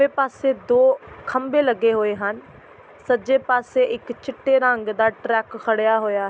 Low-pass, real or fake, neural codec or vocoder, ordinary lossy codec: none; real; none; none